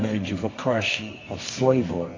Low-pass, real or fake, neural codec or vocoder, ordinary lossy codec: 7.2 kHz; fake; codec, 24 kHz, 0.9 kbps, WavTokenizer, medium music audio release; AAC, 32 kbps